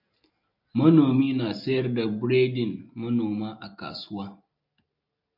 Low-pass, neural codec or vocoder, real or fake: 5.4 kHz; none; real